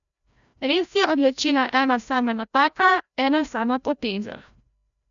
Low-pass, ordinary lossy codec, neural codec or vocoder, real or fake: 7.2 kHz; none; codec, 16 kHz, 0.5 kbps, FreqCodec, larger model; fake